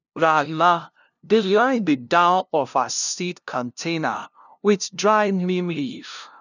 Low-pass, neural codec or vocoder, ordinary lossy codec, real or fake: 7.2 kHz; codec, 16 kHz, 0.5 kbps, FunCodec, trained on LibriTTS, 25 frames a second; none; fake